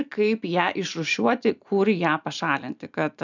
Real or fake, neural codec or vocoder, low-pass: real; none; 7.2 kHz